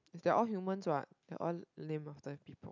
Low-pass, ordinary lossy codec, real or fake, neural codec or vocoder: 7.2 kHz; none; real; none